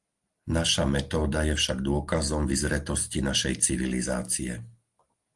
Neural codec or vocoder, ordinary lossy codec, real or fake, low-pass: none; Opus, 24 kbps; real; 10.8 kHz